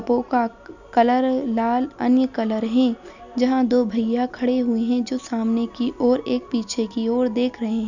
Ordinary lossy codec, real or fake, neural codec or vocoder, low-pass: none; real; none; 7.2 kHz